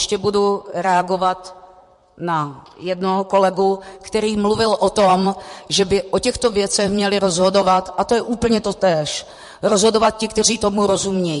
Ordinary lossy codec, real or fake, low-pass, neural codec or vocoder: MP3, 48 kbps; fake; 14.4 kHz; vocoder, 44.1 kHz, 128 mel bands, Pupu-Vocoder